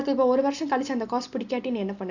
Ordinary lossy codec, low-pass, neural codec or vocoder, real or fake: none; 7.2 kHz; none; real